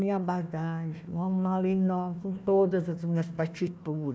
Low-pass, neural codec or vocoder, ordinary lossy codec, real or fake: none; codec, 16 kHz, 1 kbps, FunCodec, trained on Chinese and English, 50 frames a second; none; fake